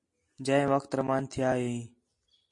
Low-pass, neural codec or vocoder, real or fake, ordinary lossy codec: 10.8 kHz; none; real; MP3, 48 kbps